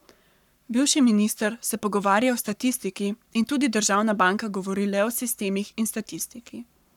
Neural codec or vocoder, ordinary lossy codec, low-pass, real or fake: codec, 44.1 kHz, 7.8 kbps, Pupu-Codec; none; 19.8 kHz; fake